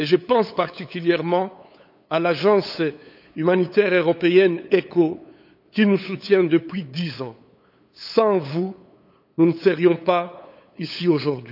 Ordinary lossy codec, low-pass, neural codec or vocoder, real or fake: none; 5.4 kHz; codec, 16 kHz, 8 kbps, FunCodec, trained on LibriTTS, 25 frames a second; fake